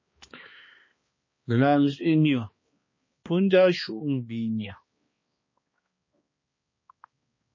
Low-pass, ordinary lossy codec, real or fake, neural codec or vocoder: 7.2 kHz; MP3, 32 kbps; fake; codec, 16 kHz, 2 kbps, X-Codec, HuBERT features, trained on balanced general audio